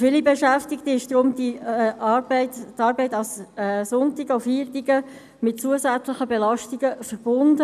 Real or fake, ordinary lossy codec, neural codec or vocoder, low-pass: real; none; none; 14.4 kHz